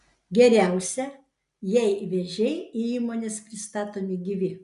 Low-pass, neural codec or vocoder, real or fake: 10.8 kHz; none; real